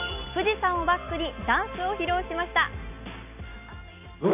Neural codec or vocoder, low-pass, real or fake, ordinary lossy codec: none; 3.6 kHz; real; none